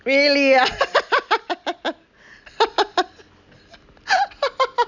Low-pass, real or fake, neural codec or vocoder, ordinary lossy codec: 7.2 kHz; real; none; none